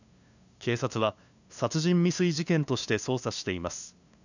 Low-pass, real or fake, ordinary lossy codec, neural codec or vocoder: 7.2 kHz; fake; none; codec, 16 kHz, 2 kbps, FunCodec, trained on LibriTTS, 25 frames a second